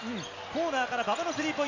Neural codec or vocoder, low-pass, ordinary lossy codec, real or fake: none; 7.2 kHz; MP3, 64 kbps; real